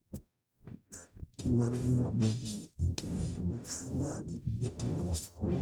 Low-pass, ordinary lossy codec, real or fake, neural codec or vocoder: none; none; fake; codec, 44.1 kHz, 0.9 kbps, DAC